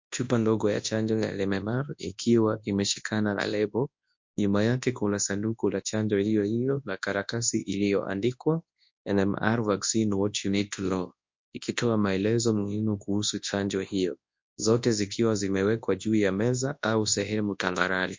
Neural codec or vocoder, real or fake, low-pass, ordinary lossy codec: codec, 24 kHz, 0.9 kbps, WavTokenizer, large speech release; fake; 7.2 kHz; MP3, 48 kbps